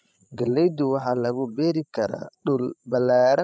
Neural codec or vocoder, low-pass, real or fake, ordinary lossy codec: codec, 16 kHz, 16 kbps, FreqCodec, larger model; none; fake; none